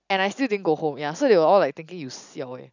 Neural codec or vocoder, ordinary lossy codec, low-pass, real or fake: none; none; 7.2 kHz; real